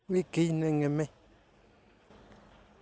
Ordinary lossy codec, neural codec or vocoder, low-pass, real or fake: none; none; none; real